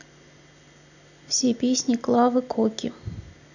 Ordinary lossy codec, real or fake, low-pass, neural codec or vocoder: none; real; 7.2 kHz; none